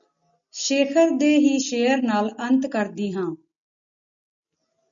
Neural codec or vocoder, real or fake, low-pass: none; real; 7.2 kHz